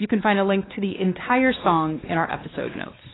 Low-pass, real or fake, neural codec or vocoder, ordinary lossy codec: 7.2 kHz; fake; codec, 16 kHz, 2 kbps, X-Codec, WavLM features, trained on Multilingual LibriSpeech; AAC, 16 kbps